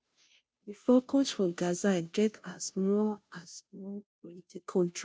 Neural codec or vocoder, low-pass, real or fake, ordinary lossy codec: codec, 16 kHz, 0.5 kbps, FunCodec, trained on Chinese and English, 25 frames a second; none; fake; none